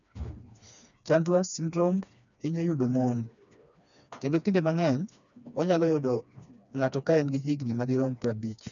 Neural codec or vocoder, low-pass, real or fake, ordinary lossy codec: codec, 16 kHz, 2 kbps, FreqCodec, smaller model; 7.2 kHz; fake; none